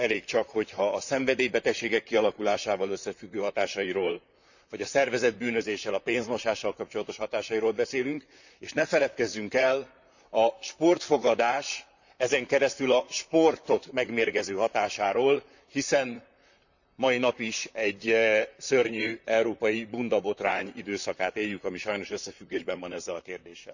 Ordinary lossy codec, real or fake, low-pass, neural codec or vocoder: none; fake; 7.2 kHz; vocoder, 44.1 kHz, 128 mel bands, Pupu-Vocoder